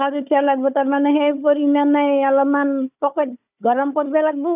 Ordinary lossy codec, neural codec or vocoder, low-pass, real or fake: none; codec, 16 kHz, 4 kbps, FunCodec, trained on Chinese and English, 50 frames a second; 3.6 kHz; fake